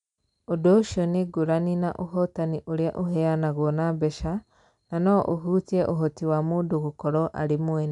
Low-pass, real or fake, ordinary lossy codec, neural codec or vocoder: 10.8 kHz; real; none; none